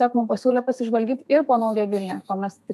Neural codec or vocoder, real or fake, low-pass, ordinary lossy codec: codec, 32 kHz, 1.9 kbps, SNAC; fake; 14.4 kHz; AAC, 96 kbps